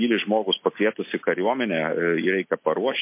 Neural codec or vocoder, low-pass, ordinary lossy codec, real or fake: none; 3.6 kHz; MP3, 24 kbps; real